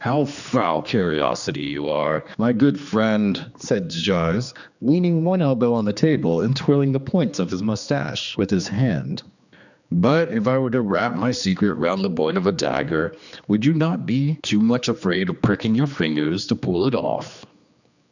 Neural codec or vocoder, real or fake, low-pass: codec, 16 kHz, 2 kbps, X-Codec, HuBERT features, trained on general audio; fake; 7.2 kHz